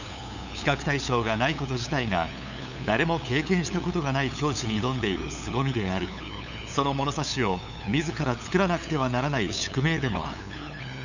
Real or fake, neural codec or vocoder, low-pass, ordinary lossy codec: fake; codec, 16 kHz, 8 kbps, FunCodec, trained on LibriTTS, 25 frames a second; 7.2 kHz; none